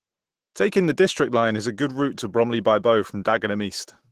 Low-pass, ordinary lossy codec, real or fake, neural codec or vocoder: 14.4 kHz; Opus, 16 kbps; fake; autoencoder, 48 kHz, 128 numbers a frame, DAC-VAE, trained on Japanese speech